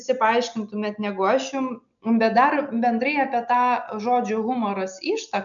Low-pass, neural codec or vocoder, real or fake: 7.2 kHz; none; real